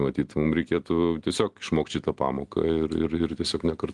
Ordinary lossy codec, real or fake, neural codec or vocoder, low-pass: Opus, 24 kbps; real; none; 10.8 kHz